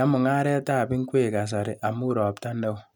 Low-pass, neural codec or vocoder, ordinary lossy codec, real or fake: 19.8 kHz; none; none; real